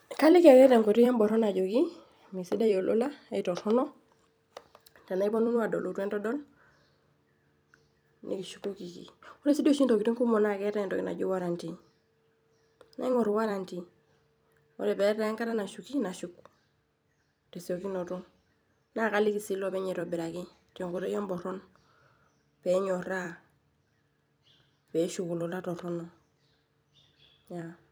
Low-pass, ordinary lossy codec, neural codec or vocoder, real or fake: none; none; vocoder, 44.1 kHz, 128 mel bands every 256 samples, BigVGAN v2; fake